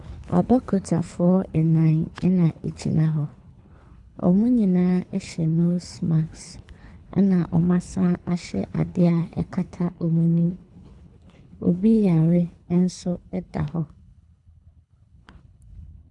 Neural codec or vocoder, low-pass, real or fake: codec, 24 kHz, 3 kbps, HILCodec; 10.8 kHz; fake